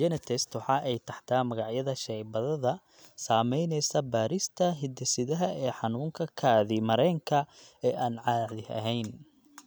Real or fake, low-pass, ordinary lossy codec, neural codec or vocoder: real; none; none; none